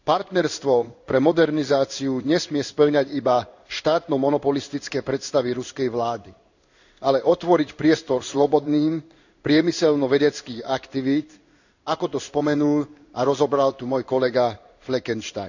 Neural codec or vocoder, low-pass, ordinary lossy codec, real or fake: codec, 16 kHz in and 24 kHz out, 1 kbps, XY-Tokenizer; 7.2 kHz; none; fake